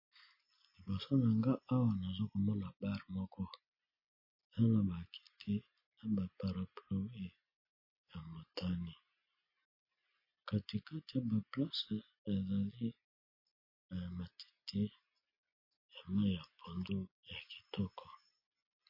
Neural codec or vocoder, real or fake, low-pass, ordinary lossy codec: none; real; 5.4 kHz; MP3, 24 kbps